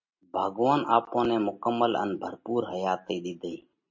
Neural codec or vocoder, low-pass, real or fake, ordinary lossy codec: none; 7.2 kHz; real; MP3, 32 kbps